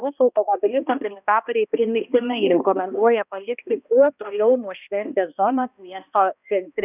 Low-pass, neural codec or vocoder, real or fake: 3.6 kHz; codec, 16 kHz, 1 kbps, X-Codec, HuBERT features, trained on balanced general audio; fake